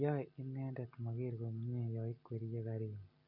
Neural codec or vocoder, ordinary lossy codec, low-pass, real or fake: none; none; 5.4 kHz; real